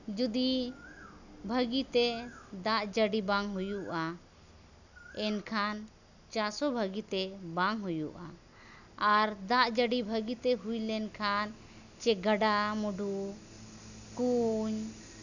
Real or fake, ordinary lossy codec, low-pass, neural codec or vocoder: real; none; 7.2 kHz; none